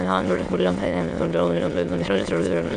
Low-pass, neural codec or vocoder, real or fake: 9.9 kHz; autoencoder, 22.05 kHz, a latent of 192 numbers a frame, VITS, trained on many speakers; fake